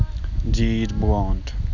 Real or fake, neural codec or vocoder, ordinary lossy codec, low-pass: real; none; none; 7.2 kHz